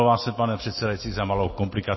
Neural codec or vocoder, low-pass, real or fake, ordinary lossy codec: none; 7.2 kHz; real; MP3, 24 kbps